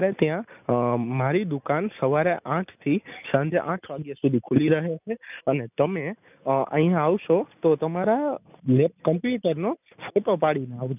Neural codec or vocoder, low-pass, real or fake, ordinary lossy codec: none; 3.6 kHz; real; none